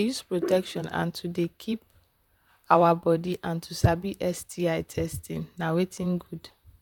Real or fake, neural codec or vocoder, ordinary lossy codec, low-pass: fake; vocoder, 44.1 kHz, 128 mel bands, Pupu-Vocoder; none; 19.8 kHz